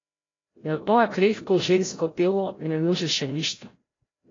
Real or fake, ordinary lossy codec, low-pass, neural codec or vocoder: fake; AAC, 32 kbps; 7.2 kHz; codec, 16 kHz, 0.5 kbps, FreqCodec, larger model